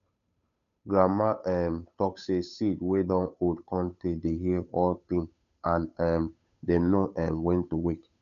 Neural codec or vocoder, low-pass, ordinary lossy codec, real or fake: codec, 16 kHz, 8 kbps, FunCodec, trained on Chinese and English, 25 frames a second; 7.2 kHz; MP3, 96 kbps; fake